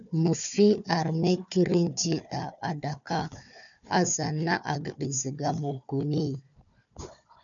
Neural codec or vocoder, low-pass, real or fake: codec, 16 kHz, 4 kbps, FunCodec, trained on Chinese and English, 50 frames a second; 7.2 kHz; fake